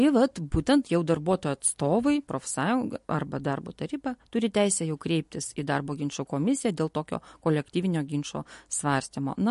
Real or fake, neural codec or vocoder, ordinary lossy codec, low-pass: real; none; MP3, 48 kbps; 14.4 kHz